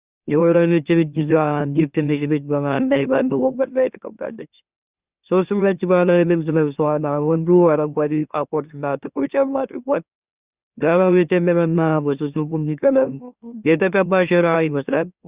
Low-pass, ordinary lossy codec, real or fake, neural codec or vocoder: 3.6 kHz; Opus, 64 kbps; fake; autoencoder, 44.1 kHz, a latent of 192 numbers a frame, MeloTTS